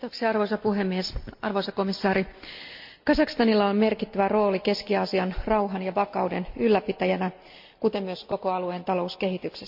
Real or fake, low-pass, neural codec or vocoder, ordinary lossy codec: real; 5.4 kHz; none; MP3, 48 kbps